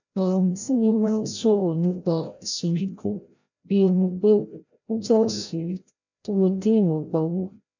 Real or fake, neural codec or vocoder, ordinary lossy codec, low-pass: fake; codec, 16 kHz, 0.5 kbps, FreqCodec, larger model; none; 7.2 kHz